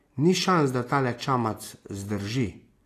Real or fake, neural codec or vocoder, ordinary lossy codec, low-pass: real; none; AAC, 48 kbps; 14.4 kHz